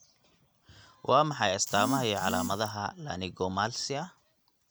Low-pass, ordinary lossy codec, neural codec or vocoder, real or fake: none; none; none; real